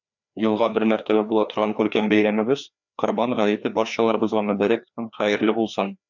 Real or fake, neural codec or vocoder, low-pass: fake; codec, 16 kHz, 2 kbps, FreqCodec, larger model; 7.2 kHz